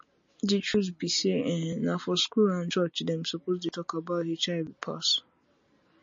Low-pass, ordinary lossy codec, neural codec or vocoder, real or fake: 7.2 kHz; MP3, 32 kbps; none; real